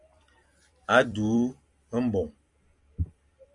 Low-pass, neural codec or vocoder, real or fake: 10.8 kHz; vocoder, 44.1 kHz, 128 mel bands every 256 samples, BigVGAN v2; fake